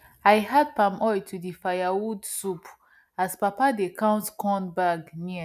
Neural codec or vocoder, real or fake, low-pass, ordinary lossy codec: none; real; 14.4 kHz; none